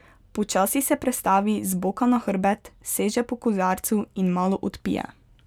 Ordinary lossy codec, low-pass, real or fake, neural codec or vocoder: none; 19.8 kHz; real; none